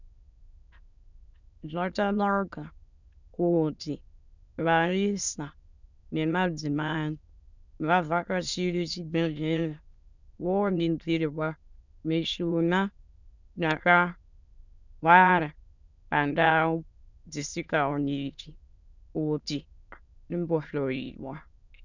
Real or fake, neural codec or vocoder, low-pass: fake; autoencoder, 22.05 kHz, a latent of 192 numbers a frame, VITS, trained on many speakers; 7.2 kHz